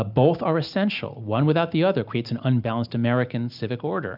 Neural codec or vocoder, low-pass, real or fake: none; 5.4 kHz; real